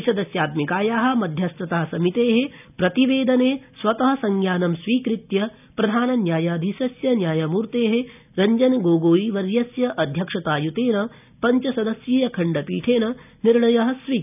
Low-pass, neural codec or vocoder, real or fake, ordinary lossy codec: 3.6 kHz; none; real; none